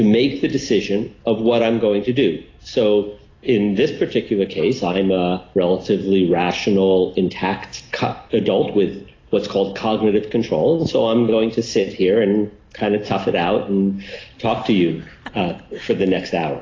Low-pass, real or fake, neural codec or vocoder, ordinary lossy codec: 7.2 kHz; real; none; AAC, 32 kbps